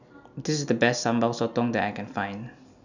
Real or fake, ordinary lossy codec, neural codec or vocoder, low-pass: real; none; none; 7.2 kHz